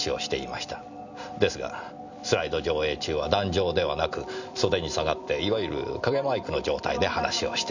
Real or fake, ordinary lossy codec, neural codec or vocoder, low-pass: real; none; none; 7.2 kHz